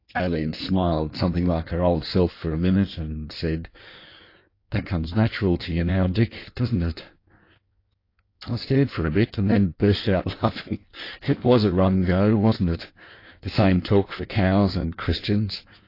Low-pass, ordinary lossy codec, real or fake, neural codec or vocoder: 5.4 kHz; AAC, 32 kbps; fake; codec, 16 kHz in and 24 kHz out, 1.1 kbps, FireRedTTS-2 codec